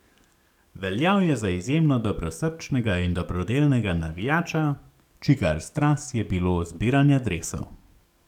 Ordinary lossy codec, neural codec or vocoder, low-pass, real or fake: none; codec, 44.1 kHz, 7.8 kbps, DAC; 19.8 kHz; fake